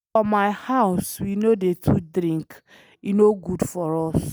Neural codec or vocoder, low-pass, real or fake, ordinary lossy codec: none; none; real; none